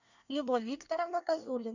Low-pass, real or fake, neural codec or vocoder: 7.2 kHz; fake; codec, 24 kHz, 1 kbps, SNAC